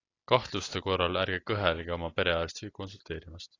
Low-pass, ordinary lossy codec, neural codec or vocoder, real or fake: 7.2 kHz; AAC, 32 kbps; none; real